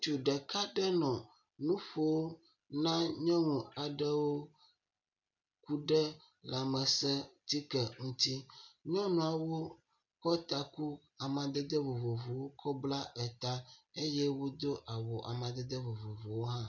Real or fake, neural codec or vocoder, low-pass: real; none; 7.2 kHz